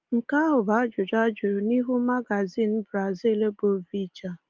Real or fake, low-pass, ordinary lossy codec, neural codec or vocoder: real; 7.2 kHz; Opus, 32 kbps; none